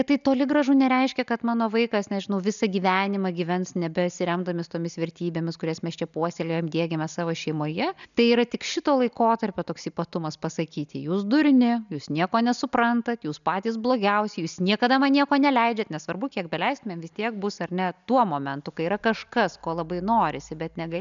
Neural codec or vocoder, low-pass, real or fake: none; 7.2 kHz; real